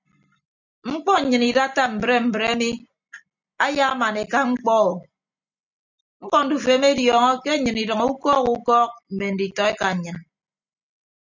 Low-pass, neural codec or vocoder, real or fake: 7.2 kHz; none; real